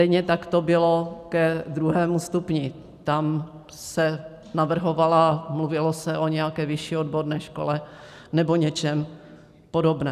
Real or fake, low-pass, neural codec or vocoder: real; 14.4 kHz; none